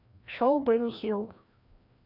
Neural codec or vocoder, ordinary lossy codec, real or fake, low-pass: codec, 16 kHz, 1 kbps, FreqCodec, larger model; none; fake; 5.4 kHz